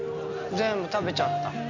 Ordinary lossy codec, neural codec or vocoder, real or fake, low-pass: none; none; real; 7.2 kHz